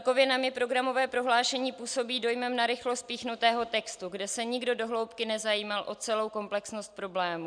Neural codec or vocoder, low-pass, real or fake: none; 9.9 kHz; real